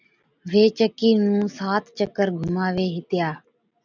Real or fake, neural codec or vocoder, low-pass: real; none; 7.2 kHz